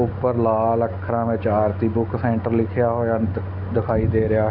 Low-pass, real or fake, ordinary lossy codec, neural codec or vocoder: 5.4 kHz; real; none; none